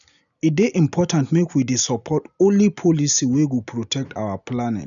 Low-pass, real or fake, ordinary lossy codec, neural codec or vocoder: 7.2 kHz; real; none; none